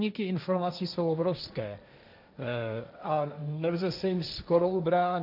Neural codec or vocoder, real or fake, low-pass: codec, 16 kHz, 1.1 kbps, Voila-Tokenizer; fake; 5.4 kHz